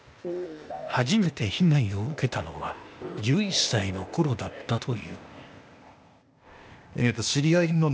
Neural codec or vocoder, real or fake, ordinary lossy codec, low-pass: codec, 16 kHz, 0.8 kbps, ZipCodec; fake; none; none